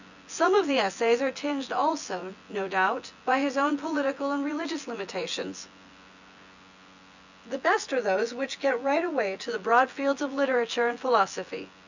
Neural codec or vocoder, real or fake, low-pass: vocoder, 24 kHz, 100 mel bands, Vocos; fake; 7.2 kHz